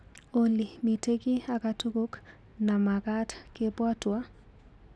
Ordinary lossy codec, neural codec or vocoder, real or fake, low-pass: none; none; real; none